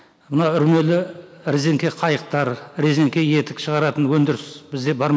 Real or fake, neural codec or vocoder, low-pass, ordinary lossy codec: real; none; none; none